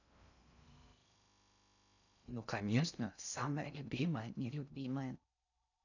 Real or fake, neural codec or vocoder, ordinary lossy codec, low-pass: fake; codec, 16 kHz in and 24 kHz out, 0.6 kbps, FocalCodec, streaming, 2048 codes; none; 7.2 kHz